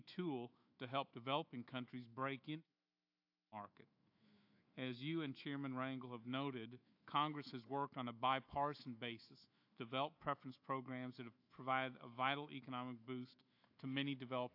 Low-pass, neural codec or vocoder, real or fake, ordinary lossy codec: 5.4 kHz; autoencoder, 48 kHz, 128 numbers a frame, DAC-VAE, trained on Japanese speech; fake; AAC, 48 kbps